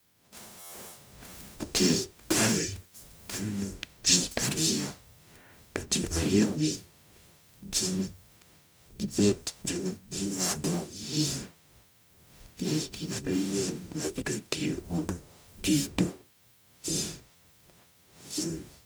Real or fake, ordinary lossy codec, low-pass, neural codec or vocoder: fake; none; none; codec, 44.1 kHz, 0.9 kbps, DAC